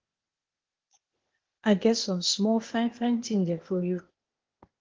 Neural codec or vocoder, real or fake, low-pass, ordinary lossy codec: codec, 16 kHz, 0.8 kbps, ZipCodec; fake; 7.2 kHz; Opus, 16 kbps